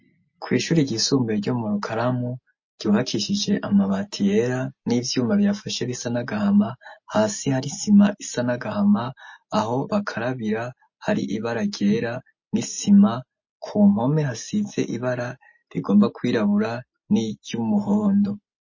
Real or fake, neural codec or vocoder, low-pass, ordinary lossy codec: real; none; 7.2 kHz; MP3, 32 kbps